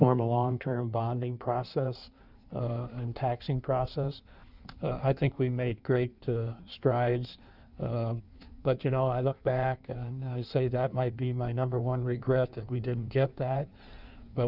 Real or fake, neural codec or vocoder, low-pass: fake; codec, 16 kHz in and 24 kHz out, 1.1 kbps, FireRedTTS-2 codec; 5.4 kHz